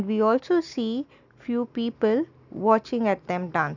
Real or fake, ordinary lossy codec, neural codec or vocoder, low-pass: real; none; none; 7.2 kHz